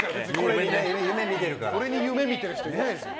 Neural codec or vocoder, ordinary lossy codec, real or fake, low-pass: none; none; real; none